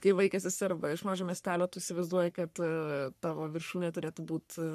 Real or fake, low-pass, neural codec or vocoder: fake; 14.4 kHz; codec, 44.1 kHz, 3.4 kbps, Pupu-Codec